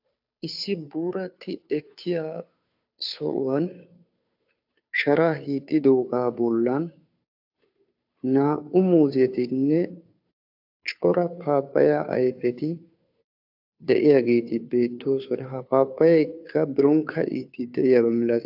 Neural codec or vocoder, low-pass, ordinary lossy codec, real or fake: codec, 16 kHz, 2 kbps, FunCodec, trained on Chinese and English, 25 frames a second; 5.4 kHz; AAC, 48 kbps; fake